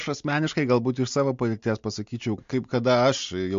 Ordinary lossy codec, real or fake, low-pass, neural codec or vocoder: MP3, 48 kbps; real; 7.2 kHz; none